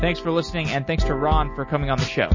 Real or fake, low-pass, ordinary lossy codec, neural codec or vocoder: real; 7.2 kHz; MP3, 32 kbps; none